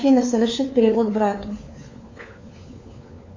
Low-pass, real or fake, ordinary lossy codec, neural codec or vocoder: 7.2 kHz; fake; AAC, 48 kbps; codec, 16 kHz, 4 kbps, FunCodec, trained on LibriTTS, 50 frames a second